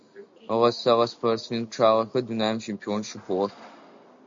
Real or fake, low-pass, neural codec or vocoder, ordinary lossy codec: real; 7.2 kHz; none; MP3, 48 kbps